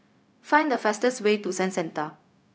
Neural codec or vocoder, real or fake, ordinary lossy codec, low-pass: codec, 16 kHz, 0.4 kbps, LongCat-Audio-Codec; fake; none; none